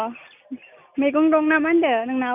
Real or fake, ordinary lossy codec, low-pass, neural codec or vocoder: real; none; 3.6 kHz; none